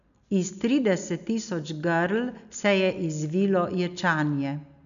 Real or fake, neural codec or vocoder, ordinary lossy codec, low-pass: real; none; none; 7.2 kHz